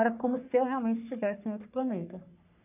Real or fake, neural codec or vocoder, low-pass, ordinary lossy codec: fake; codec, 44.1 kHz, 3.4 kbps, Pupu-Codec; 3.6 kHz; none